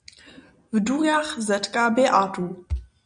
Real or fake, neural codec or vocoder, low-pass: real; none; 9.9 kHz